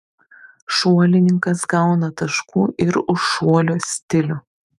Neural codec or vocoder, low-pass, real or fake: autoencoder, 48 kHz, 128 numbers a frame, DAC-VAE, trained on Japanese speech; 14.4 kHz; fake